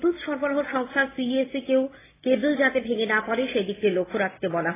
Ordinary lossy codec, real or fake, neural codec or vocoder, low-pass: AAC, 16 kbps; real; none; 3.6 kHz